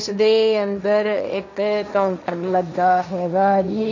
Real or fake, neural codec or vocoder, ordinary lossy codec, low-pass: fake; codec, 16 kHz, 1.1 kbps, Voila-Tokenizer; none; 7.2 kHz